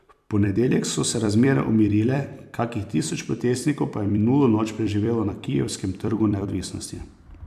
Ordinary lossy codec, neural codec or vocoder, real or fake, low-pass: none; vocoder, 44.1 kHz, 128 mel bands every 256 samples, BigVGAN v2; fake; 14.4 kHz